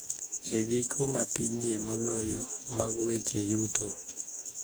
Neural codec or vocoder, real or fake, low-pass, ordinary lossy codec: codec, 44.1 kHz, 2.6 kbps, DAC; fake; none; none